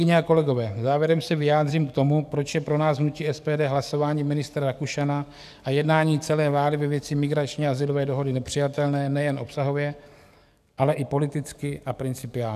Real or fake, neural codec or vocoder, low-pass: fake; codec, 44.1 kHz, 7.8 kbps, DAC; 14.4 kHz